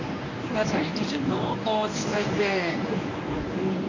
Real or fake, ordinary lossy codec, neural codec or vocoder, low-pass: fake; none; codec, 24 kHz, 0.9 kbps, WavTokenizer, medium speech release version 1; 7.2 kHz